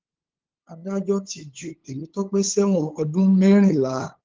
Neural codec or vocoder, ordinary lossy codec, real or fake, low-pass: codec, 16 kHz, 8 kbps, FunCodec, trained on LibriTTS, 25 frames a second; Opus, 16 kbps; fake; 7.2 kHz